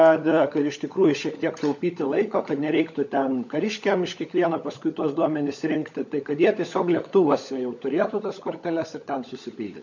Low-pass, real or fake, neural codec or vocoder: 7.2 kHz; fake; codec, 16 kHz, 16 kbps, FunCodec, trained on LibriTTS, 50 frames a second